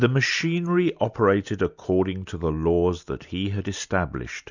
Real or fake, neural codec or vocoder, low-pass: real; none; 7.2 kHz